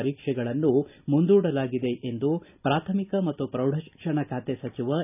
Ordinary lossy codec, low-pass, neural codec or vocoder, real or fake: none; 3.6 kHz; none; real